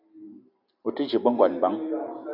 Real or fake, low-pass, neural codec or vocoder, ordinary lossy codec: real; 5.4 kHz; none; AAC, 48 kbps